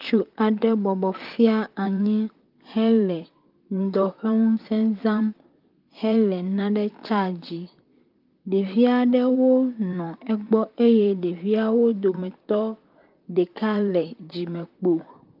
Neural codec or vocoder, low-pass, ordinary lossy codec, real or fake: vocoder, 44.1 kHz, 128 mel bands, Pupu-Vocoder; 5.4 kHz; Opus, 32 kbps; fake